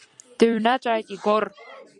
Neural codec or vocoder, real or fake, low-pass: vocoder, 44.1 kHz, 128 mel bands every 256 samples, BigVGAN v2; fake; 10.8 kHz